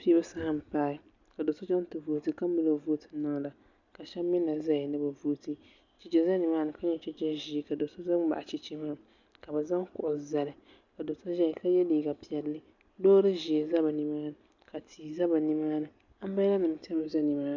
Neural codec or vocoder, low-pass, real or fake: none; 7.2 kHz; real